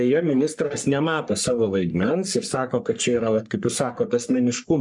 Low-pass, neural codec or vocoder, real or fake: 10.8 kHz; codec, 44.1 kHz, 3.4 kbps, Pupu-Codec; fake